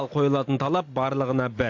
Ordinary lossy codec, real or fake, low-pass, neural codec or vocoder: AAC, 48 kbps; real; 7.2 kHz; none